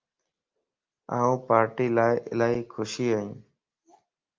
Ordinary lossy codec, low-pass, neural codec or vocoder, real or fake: Opus, 24 kbps; 7.2 kHz; none; real